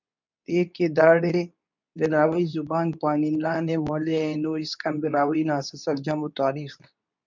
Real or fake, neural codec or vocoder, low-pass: fake; codec, 24 kHz, 0.9 kbps, WavTokenizer, medium speech release version 2; 7.2 kHz